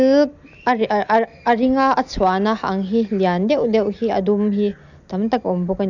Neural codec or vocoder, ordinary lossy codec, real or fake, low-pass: none; none; real; 7.2 kHz